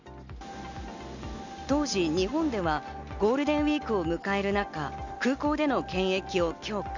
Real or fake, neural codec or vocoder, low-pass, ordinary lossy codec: real; none; 7.2 kHz; none